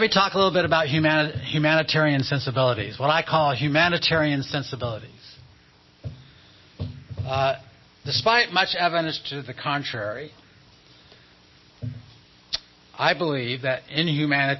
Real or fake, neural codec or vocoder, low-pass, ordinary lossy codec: real; none; 7.2 kHz; MP3, 24 kbps